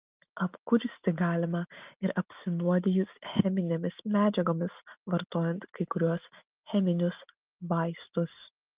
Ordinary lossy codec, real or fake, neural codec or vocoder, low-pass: Opus, 32 kbps; real; none; 3.6 kHz